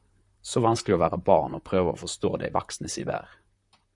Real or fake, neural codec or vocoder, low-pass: fake; vocoder, 44.1 kHz, 128 mel bands, Pupu-Vocoder; 10.8 kHz